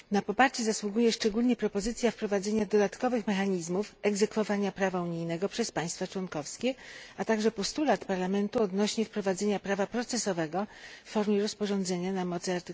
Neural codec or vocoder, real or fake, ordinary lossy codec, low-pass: none; real; none; none